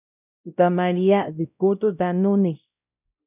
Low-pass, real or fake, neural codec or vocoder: 3.6 kHz; fake; codec, 16 kHz, 0.5 kbps, X-Codec, WavLM features, trained on Multilingual LibriSpeech